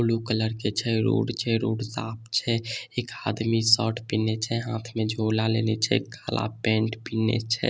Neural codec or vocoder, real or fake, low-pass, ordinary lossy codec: none; real; none; none